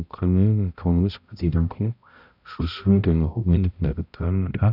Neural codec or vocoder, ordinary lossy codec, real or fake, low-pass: codec, 16 kHz, 0.5 kbps, X-Codec, HuBERT features, trained on balanced general audio; none; fake; 5.4 kHz